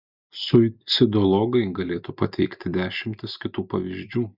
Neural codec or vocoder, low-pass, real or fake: none; 5.4 kHz; real